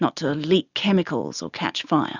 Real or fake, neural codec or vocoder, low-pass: real; none; 7.2 kHz